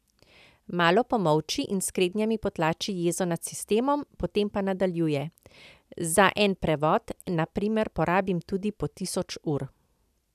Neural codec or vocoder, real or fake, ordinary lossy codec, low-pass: none; real; none; 14.4 kHz